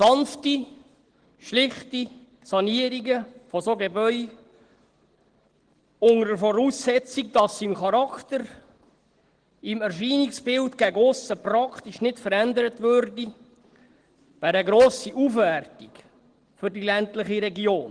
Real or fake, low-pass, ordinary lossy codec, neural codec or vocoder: real; 9.9 kHz; Opus, 16 kbps; none